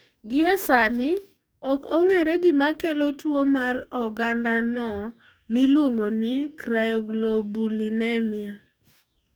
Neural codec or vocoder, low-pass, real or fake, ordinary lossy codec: codec, 44.1 kHz, 2.6 kbps, DAC; none; fake; none